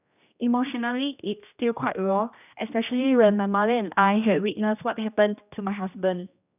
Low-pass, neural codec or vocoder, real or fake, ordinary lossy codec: 3.6 kHz; codec, 16 kHz, 1 kbps, X-Codec, HuBERT features, trained on general audio; fake; none